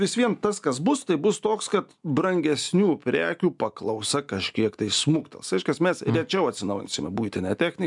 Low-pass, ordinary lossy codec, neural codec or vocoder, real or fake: 10.8 kHz; MP3, 96 kbps; none; real